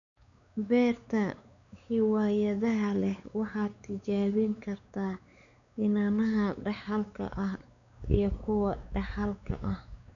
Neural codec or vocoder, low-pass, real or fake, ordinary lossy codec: codec, 16 kHz, 4 kbps, X-Codec, WavLM features, trained on Multilingual LibriSpeech; 7.2 kHz; fake; none